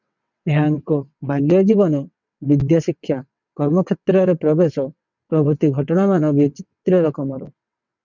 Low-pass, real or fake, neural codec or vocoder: 7.2 kHz; fake; vocoder, 22.05 kHz, 80 mel bands, WaveNeXt